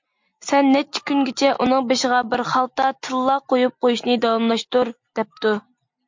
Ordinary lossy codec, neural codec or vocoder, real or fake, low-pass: MP3, 48 kbps; none; real; 7.2 kHz